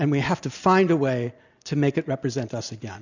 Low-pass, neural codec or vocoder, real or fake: 7.2 kHz; none; real